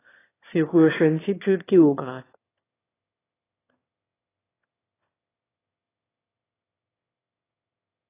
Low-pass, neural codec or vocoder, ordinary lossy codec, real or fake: 3.6 kHz; autoencoder, 22.05 kHz, a latent of 192 numbers a frame, VITS, trained on one speaker; AAC, 16 kbps; fake